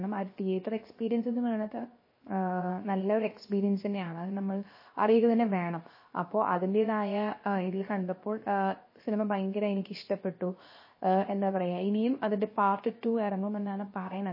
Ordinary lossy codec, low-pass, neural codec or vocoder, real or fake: MP3, 24 kbps; 5.4 kHz; codec, 16 kHz, 0.7 kbps, FocalCodec; fake